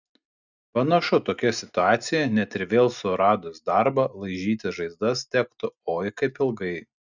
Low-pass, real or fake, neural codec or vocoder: 7.2 kHz; real; none